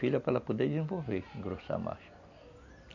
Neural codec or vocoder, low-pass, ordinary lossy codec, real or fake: none; 7.2 kHz; none; real